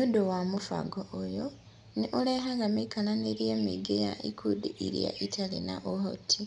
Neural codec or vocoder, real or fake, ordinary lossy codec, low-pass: none; real; none; 10.8 kHz